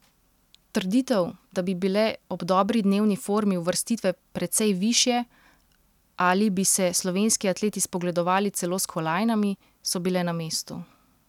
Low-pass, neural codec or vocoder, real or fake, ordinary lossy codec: 19.8 kHz; none; real; none